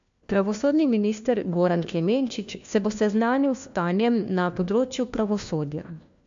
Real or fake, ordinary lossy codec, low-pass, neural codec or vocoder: fake; MP3, 64 kbps; 7.2 kHz; codec, 16 kHz, 1 kbps, FunCodec, trained on LibriTTS, 50 frames a second